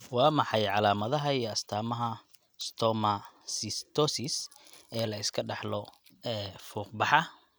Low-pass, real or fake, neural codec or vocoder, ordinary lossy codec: none; real; none; none